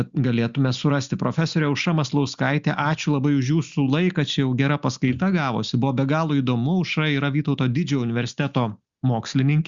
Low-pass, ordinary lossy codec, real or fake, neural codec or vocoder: 7.2 kHz; Opus, 64 kbps; real; none